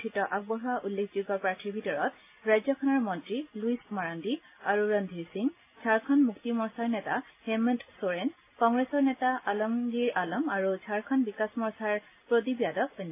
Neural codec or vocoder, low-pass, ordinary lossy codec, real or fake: none; 3.6 kHz; AAC, 24 kbps; real